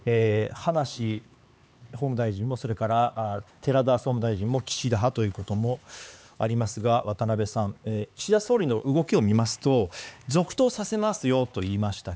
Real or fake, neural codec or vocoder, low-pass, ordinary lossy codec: fake; codec, 16 kHz, 4 kbps, X-Codec, HuBERT features, trained on LibriSpeech; none; none